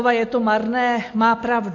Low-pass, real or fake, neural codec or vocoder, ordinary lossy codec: 7.2 kHz; real; none; AAC, 48 kbps